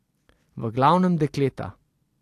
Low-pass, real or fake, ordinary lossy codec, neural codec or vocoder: 14.4 kHz; fake; AAC, 64 kbps; vocoder, 44.1 kHz, 128 mel bands every 256 samples, BigVGAN v2